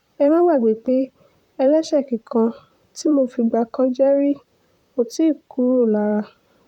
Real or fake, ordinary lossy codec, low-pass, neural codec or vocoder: fake; none; 19.8 kHz; vocoder, 44.1 kHz, 128 mel bands, Pupu-Vocoder